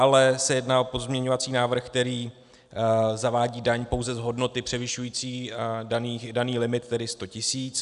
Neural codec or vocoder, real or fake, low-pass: none; real; 10.8 kHz